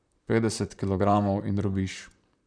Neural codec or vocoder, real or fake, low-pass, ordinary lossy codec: vocoder, 44.1 kHz, 128 mel bands, Pupu-Vocoder; fake; 9.9 kHz; none